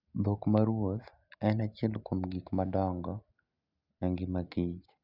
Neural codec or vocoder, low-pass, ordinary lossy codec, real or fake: none; 5.4 kHz; none; real